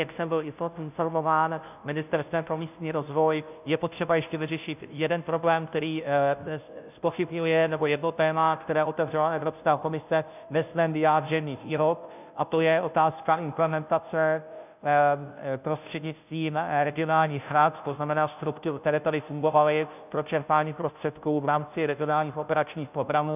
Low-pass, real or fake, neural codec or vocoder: 3.6 kHz; fake; codec, 16 kHz, 0.5 kbps, FunCodec, trained on Chinese and English, 25 frames a second